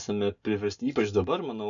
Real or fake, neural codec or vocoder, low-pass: real; none; 7.2 kHz